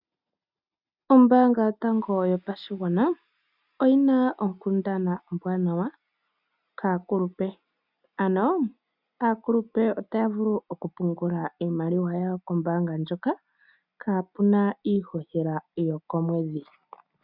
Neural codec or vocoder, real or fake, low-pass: none; real; 5.4 kHz